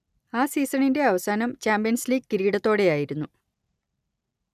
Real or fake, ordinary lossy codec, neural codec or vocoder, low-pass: real; none; none; 14.4 kHz